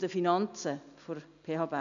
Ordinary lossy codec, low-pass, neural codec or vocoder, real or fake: none; 7.2 kHz; none; real